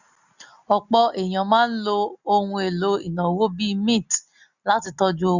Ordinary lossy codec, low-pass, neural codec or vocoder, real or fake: none; 7.2 kHz; none; real